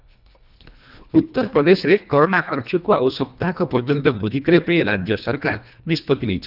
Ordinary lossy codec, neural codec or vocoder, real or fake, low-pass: none; codec, 24 kHz, 1.5 kbps, HILCodec; fake; 5.4 kHz